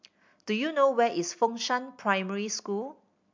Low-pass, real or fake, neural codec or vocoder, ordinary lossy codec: 7.2 kHz; real; none; MP3, 64 kbps